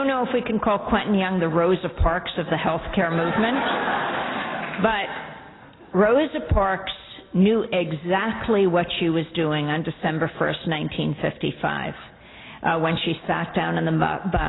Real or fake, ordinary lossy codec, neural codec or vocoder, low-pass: real; AAC, 16 kbps; none; 7.2 kHz